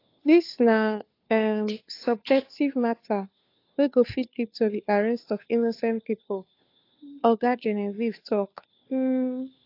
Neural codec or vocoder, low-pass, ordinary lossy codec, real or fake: codec, 16 kHz, 2 kbps, FunCodec, trained on Chinese and English, 25 frames a second; 5.4 kHz; AAC, 32 kbps; fake